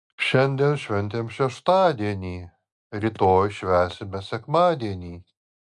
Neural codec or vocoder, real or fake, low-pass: none; real; 10.8 kHz